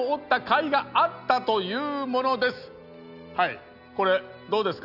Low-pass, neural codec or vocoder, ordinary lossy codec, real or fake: 5.4 kHz; none; none; real